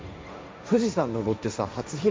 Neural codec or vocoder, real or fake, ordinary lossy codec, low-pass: codec, 16 kHz, 1.1 kbps, Voila-Tokenizer; fake; none; none